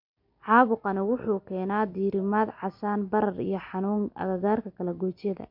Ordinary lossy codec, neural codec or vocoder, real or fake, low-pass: MP3, 48 kbps; none; real; 5.4 kHz